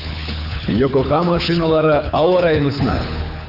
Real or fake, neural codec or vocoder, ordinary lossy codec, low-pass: fake; codec, 24 kHz, 6 kbps, HILCodec; none; 5.4 kHz